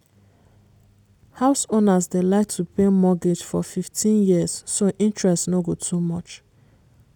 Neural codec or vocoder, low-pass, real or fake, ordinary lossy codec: none; 19.8 kHz; real; none